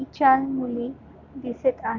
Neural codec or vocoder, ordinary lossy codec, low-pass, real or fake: none; none; 7.2 kHz; real